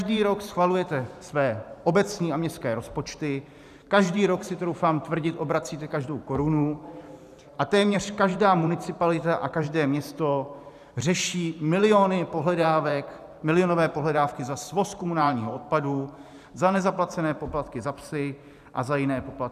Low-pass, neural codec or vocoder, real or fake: 14.4 kHz; vocoder, 44.1 kHz, 128 mel bands every 512 samples, BigVGAN v2; fake